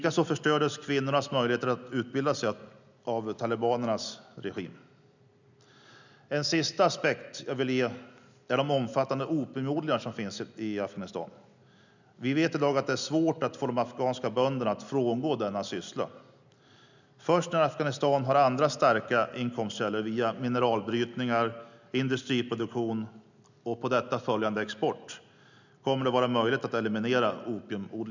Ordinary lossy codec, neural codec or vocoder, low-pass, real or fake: none; none; 7.2 kHz; real